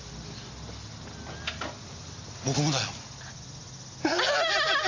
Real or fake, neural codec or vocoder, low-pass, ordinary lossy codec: real; none; 7.2 kHz; AAC, 48 kbps